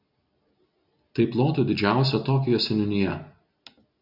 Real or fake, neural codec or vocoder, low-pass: real; none; 5.4 kHz